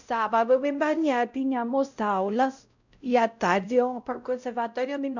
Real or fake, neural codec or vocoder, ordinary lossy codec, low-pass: fake; codec, 16 kHz, 0.5 kbps, X-Codec, WavLM features, trained on Multilingual LibriSpeech; none; 7.2 kHz